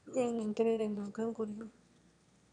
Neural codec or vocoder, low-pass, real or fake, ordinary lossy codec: autoencoder, 22.05 kHz, a latent of 192 numbers a frame, VITS, trained on one speaker; 9.9 kHz; fake; none